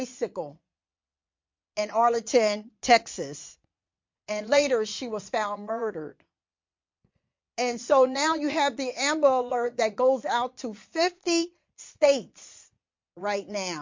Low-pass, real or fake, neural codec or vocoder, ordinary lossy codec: 7.2 kHz; fake; vocoder, 22.05 kHz, 80 mel bands, Vocos; MP3, 48 kbps